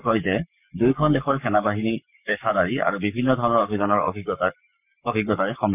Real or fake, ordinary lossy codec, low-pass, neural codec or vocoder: fake; none; 3.6 kHz; codec, 44.1 kHz, 7.8 kbps, Pupu-Codec